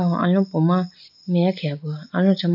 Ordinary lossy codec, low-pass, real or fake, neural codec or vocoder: none; 5.4 kHz; real; none